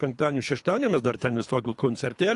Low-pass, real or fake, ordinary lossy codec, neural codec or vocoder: 10.8 kHz; fake; AAC, 48 kbps; codec, 24 kHz, 3 kbps, HILCodec